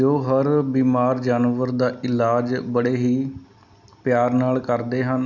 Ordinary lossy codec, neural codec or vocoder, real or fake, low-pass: none; none; real; 7.2 kHz